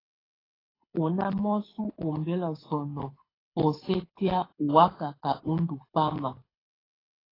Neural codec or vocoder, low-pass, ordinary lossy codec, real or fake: codec, 24 kHz, 6 kbps, HILCodec; 5.4 kHz; AAC, 24 kbps; fake